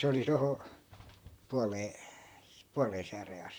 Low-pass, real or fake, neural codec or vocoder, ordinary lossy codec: none; fake; vocoder, 44.1 kHz, 128 mel bands, Pupu-Vocoder; none